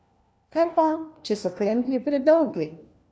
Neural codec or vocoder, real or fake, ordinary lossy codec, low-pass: codec, 16 kHz, 1 kbps, FunCodec, trained on LibriTTS, 50 frames a second; fake; none; none